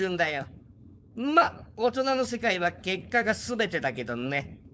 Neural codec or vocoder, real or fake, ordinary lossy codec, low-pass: codec, 16 kHz, 4.8 kbps, FACodec; fake; none; none